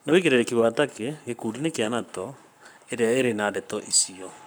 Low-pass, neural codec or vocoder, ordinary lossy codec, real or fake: none; codec, 44.1 kHz, 7.8 kbps, Pupu-Codec; none; fake